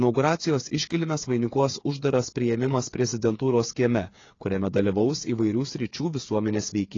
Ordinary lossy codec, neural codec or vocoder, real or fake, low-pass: AAC, 32 kbps; codec, 16 kHz, 8 kbps, FreqCodec, smaller model; fake; 7.2 kHz